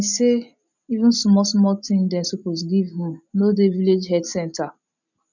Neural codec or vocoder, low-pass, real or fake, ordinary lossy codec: none; 7.2 kHz; real; none